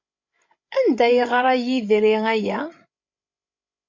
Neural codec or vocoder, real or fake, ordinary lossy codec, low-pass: codec, 16 kHz, 16 kbps, FreqCodec, larger model; fake; MP3, 48 kbps; 7.2 kHz